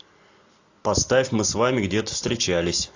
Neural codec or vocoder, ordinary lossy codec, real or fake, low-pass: none; AAC, 48 kbps; real; 7.2 kHz